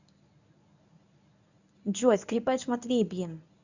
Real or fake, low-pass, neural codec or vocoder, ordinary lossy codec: fake; 7.2 kHz; codec, 24 kHz, 0.9 kbps, WavTokenizer, medium speech release version 1; none